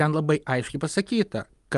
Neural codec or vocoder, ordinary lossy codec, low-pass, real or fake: none; Opus, 32 kbps; 10.8 kHz; real